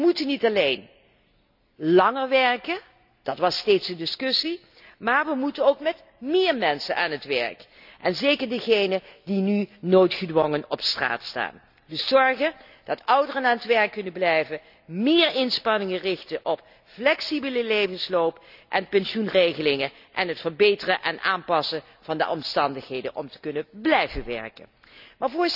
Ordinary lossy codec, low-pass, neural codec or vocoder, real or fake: none; 5.4 kHz; none; real